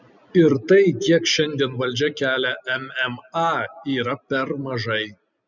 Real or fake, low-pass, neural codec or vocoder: real; 7.2 kHz; none